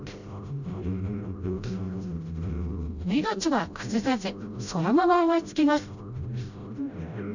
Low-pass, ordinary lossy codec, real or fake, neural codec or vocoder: 7.2 kHz; none; fake; codec, 16 kHz, 0.5 kbps, FreqCodec, smaller model